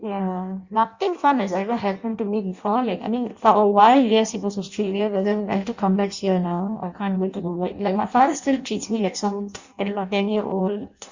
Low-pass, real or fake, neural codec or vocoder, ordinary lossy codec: 7.2 kHz; fake; codec, 16 kHz in and 24 kHz out, 0.6 kbps, FireRedTTS-2 codec; Opus, 64 kbps